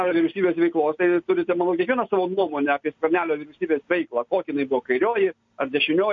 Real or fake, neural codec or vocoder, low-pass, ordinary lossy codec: real; none; 7.2 kHz; MP3, 48 kbps